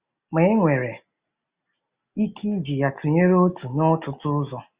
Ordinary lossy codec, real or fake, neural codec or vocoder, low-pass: Opus, 64 kbps; real; none; 3.6 kHz